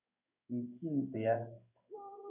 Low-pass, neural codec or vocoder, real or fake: 3.6 kHz; codec, 16 kHz in and 24 kHz out, 1 kbps, XY-Tokenizer; fake